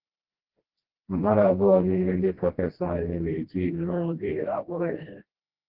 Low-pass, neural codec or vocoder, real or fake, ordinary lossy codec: 5.4 kHz; codec, 16 kHz, 1 kbps, FreqCodec, smaller model; fake; Opus, 16 kbps